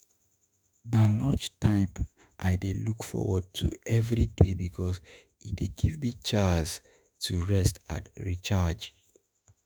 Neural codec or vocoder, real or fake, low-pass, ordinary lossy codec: autoencoder, 48 kHz, 32 numbers a frame, DAC-VAE, trained on Japanese speech; fake; none; none